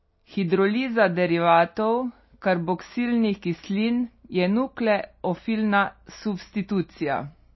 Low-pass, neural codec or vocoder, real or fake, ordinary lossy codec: 7.2 kHz; none; real; MP3, 24 kbps